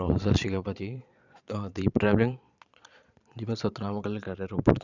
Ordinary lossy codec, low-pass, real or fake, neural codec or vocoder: none; 7.2 kHz; fake; vocoder, 22.05 kHz, 80 mel bands, Vocos